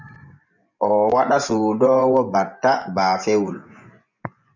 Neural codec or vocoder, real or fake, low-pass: vocoder, 24 kHz, 100 mel bands, Vocos; fake; 7.2 kHz